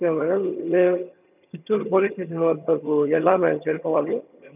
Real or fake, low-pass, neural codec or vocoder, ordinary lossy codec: fake; 3.6 kHz; vocoder, 22.05 kHz, 80 mel bands, HiFi-GAN; none